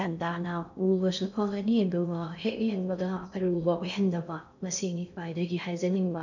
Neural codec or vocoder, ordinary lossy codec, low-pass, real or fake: codec, 16 kHz in and 24 kHz out, 0.6 kbps, FocalCodec, streaming, 4096 codes; none; 7.2 kHz; fake